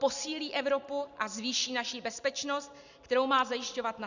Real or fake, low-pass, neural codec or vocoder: real; 7.2 kHz; none